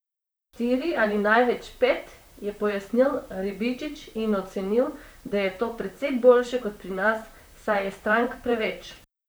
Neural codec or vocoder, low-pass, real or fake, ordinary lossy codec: vocoder, 44.1 kHz, 128 mel bands, Pupu-Vocoder; none; fake; none